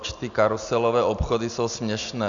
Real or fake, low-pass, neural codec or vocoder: real; 7.2 kHz; none